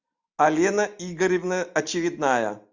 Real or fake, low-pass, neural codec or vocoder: real; 7.2 kHz; none